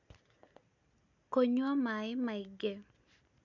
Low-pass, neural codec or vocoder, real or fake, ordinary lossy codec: 7.2 kHz; none; real; none